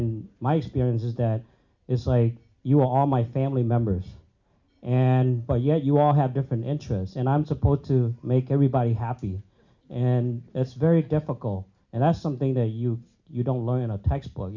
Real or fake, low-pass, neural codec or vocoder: real; 7.2 kHz; none